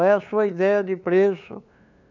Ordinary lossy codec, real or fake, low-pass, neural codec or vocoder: none; fake; 7.2 kHz; codec, 16 kHz, 2 kbps, FunCodec, trained on LibriTTS, 25 frames a second